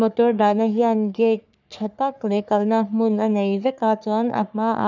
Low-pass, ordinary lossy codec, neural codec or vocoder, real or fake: 7.2 kHz; none; codec, 44.1 kHz, 3.4 kbps, Pupu-Codec; fake